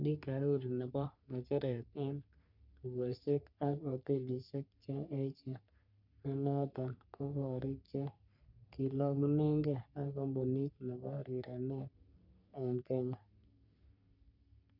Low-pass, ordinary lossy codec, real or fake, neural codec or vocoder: 5.4 kHz; none; fake; codec, 44.1 kHz, 3.4 kbps, Pupu-Codec